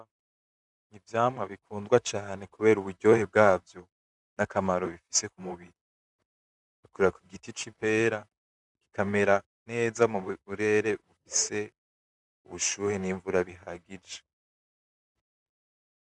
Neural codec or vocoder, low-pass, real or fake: vocoder, 44.1 kHz, 128 mel bands, Pupu-Vocoder; 10.8 kHz; fake